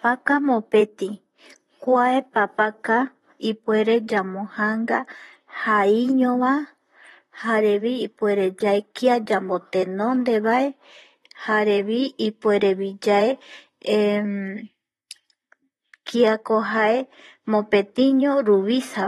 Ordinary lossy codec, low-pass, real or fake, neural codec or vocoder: AAC, 32 kbps; 19.8 kHz; fake; vocoder, 44.1 kHz, 128 mel bands, Pupu-Vocoder